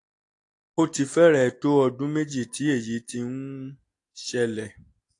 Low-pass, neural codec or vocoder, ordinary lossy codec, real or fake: 10.8 kHz; none; AAC, 48 kbps; real